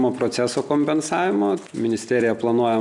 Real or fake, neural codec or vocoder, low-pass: real; none; 10.8 kHz